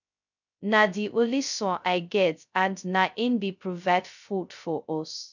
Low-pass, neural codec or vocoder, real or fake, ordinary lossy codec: 7.2 kHz; codec, 16 kHz, 0.2 kbps, FocalCodec; fake; none